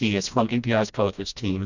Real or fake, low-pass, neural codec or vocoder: fake; 7.2 kHz; codec, 16 kHz, 1 kbps, FreqCodec, smaller model